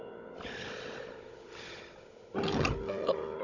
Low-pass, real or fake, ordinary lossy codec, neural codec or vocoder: 7.2 kHz; fake; none; codec, 16 kHz, 16 kbps, FunCodec, trained on Chinese and English, 50 frames a second